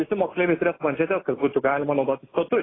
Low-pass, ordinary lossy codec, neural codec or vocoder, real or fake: 7.2 kHz; AAC, 16 kbps; none; real